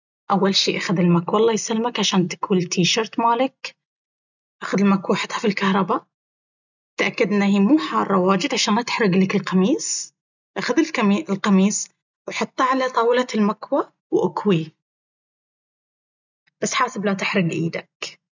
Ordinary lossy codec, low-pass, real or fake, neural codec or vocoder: none; 7.2 kHz; real; none